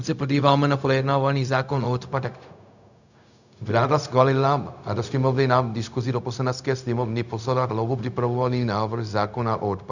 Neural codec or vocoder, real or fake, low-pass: codec, 16 kHz, 0.4 kbps, LongCat-Audio-Codec; fake; 7.2 kHz